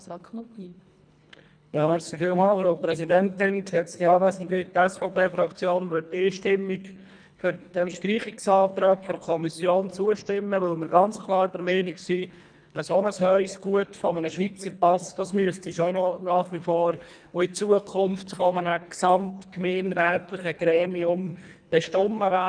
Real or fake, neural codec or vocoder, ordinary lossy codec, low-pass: fake; codec, 24 kHz, 1.5 kbps, HILCodec; none; 9.9 kHz